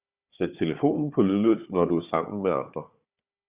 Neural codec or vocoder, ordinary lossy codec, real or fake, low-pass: codec, 16 kHz, 4 kbps, FunCodec, trained on Chinese and English, 50 frames a second; Opus, 64 kbps; fake; 3.6 kHz